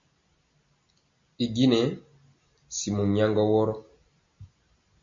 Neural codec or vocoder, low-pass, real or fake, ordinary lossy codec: none; 7.2 kHz; real; MP3, 48 kbps